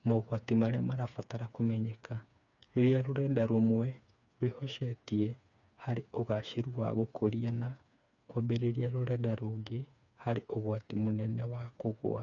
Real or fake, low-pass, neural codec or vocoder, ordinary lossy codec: fake; 7.2 kHz; codec, 16 kHz, 4 kbps, FreqCodec, smaller model; none